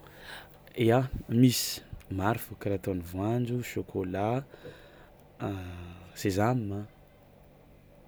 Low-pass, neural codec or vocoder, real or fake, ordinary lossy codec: none; none; real; none